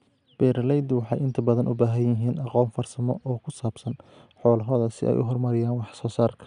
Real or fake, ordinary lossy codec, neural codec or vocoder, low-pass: real; none; none; 9.9 kHz